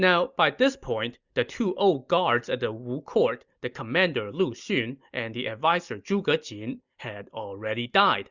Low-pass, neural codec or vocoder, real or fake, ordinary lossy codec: 7.2 kHz; none; real; Opus, 64 kbps